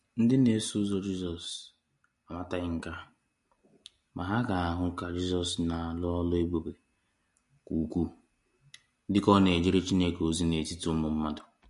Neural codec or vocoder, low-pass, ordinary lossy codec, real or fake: none; 14.4 kHz; MP3, 48 kbps; real